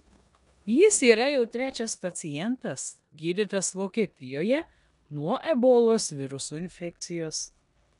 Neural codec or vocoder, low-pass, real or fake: codec, 16 kHz in and 24 kHz out, 0.9 kbps, LongCat-Audio-Codec, four codebook decoder; 10.8 kHz; fake